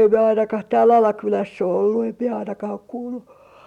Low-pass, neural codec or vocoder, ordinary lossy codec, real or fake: 19.8 kHz; none; none; real